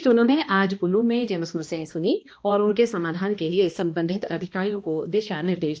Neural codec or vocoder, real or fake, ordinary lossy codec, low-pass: codec, 16 kHz, 1 kbps, X-Codec, HuBERT features, trained on balanced general audio; fake; none; none